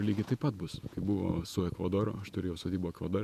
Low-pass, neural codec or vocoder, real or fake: 14.4 kHz; vocoder, 48 kHz, 128 mel bands, Vocos; fake